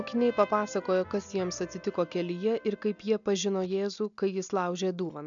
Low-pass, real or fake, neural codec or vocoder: 7.2 kHz; real; none